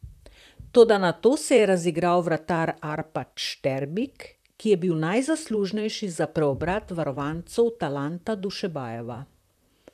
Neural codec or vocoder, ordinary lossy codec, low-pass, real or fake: vocoder, 44.1 kHz, 128 mel bands, Pupu-Vocoder; none; 14.4 kHz; fake